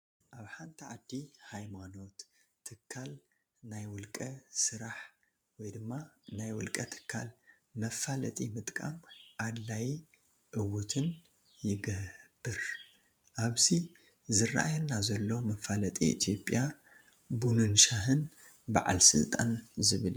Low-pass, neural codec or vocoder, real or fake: 19.8 kHz; vocoder, 44.1 kHz, 128 mel bands every 512 samples, BigVGAN v2; fake